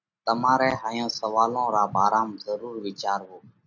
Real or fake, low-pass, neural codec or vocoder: real; 7.2 kHz; none